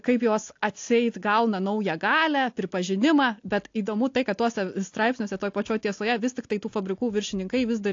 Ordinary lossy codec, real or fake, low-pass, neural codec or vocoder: AAC, 48 kbps; real; 7.2 kHz; none